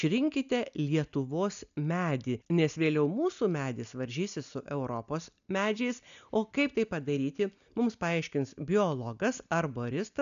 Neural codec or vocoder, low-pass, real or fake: none; 7.2 kHz; real